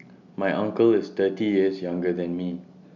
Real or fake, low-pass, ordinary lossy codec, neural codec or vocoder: real; 7.2 kHz; none; none